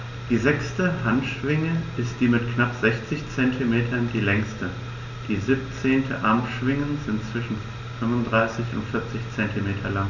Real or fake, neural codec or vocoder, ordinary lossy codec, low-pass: real; none; none; 7.2 kHz